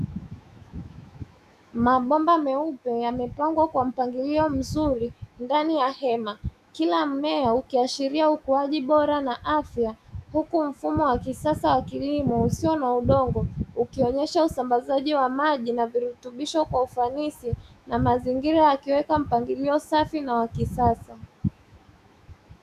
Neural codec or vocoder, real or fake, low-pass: autoencoder, 48 kHz, 128 numbers a frame, DAC-VAE, trained on Japanese speech; fake; 14.4 kHz